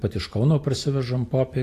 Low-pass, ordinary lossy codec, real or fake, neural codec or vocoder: 14.4 kHz; AAC, 48 kbps; real; none